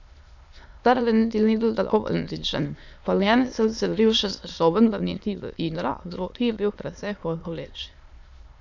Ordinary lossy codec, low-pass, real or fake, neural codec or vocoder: none; 7.2 kHz; fake; autoencoder, 22.05 kHz, a latent of 192 numbers a frame, VITS, trained on many speakers